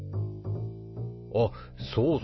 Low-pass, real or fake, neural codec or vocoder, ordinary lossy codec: 7.2 kHz; real; none; MP3, 24 kbps